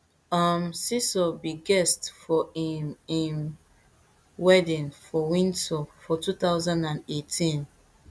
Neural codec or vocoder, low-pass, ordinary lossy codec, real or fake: none; none; none; real